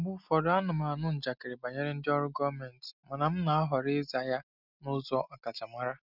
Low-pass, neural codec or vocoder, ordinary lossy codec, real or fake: 5.4 kHz; none; none; real